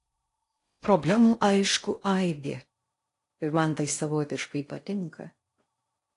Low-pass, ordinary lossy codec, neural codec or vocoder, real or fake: 10.8 kHz; AAC, 48 kbps; codec, 16 kHz in and 24 kHz out, 0.6 kbps, FocalCodec, streaming, 4096 codes; fake